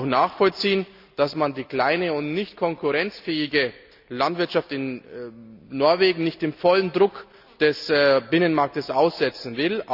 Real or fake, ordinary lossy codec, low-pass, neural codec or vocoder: real; none; 5.4 kHz; none